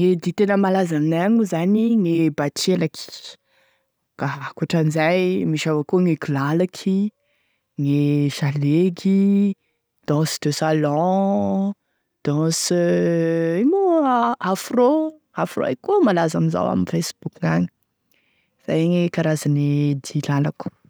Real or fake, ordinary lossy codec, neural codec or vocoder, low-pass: real; none; none; none